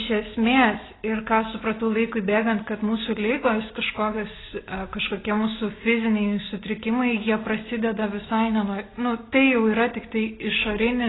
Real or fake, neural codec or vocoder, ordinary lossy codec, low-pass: real; none; AAC, 16 kbps; 7.2 kHz